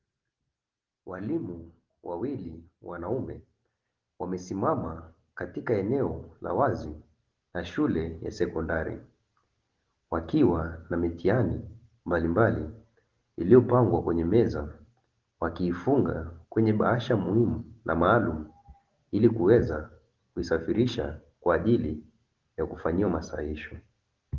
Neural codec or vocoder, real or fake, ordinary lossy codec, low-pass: none; real; Opus, 16 kbps; 7.2 kHz